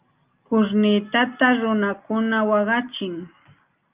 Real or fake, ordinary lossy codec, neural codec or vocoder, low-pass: real; Opus, 24 kbps; none; 3.6 kHz